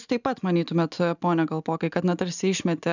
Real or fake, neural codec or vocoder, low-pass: real; none; 7.2 kHz